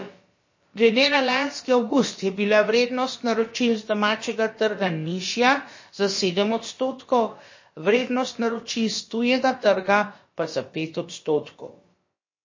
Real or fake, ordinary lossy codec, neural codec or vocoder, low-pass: fake; MP3, 32 kbps; codec, 16 kHz, about 1 kbps, DyCAST, with the encoder's durations; 7.2 kHz